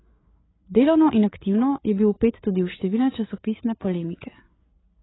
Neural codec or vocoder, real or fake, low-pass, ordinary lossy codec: codec, 16 kHz, 8 kbps, FreqCodec, larger model; fake; 7.2 kHz; AAC, 16 kbps